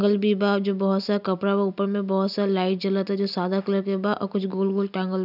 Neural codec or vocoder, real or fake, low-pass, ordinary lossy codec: none; real; 5.4 kHz; none